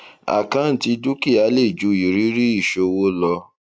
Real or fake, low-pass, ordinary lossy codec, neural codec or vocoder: real; none; none; none